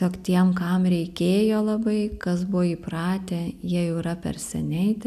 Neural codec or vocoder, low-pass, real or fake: none; 14.4 kHz; real